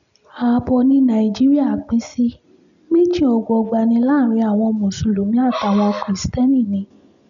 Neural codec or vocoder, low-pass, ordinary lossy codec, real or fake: none; 7.2 kHz; none; real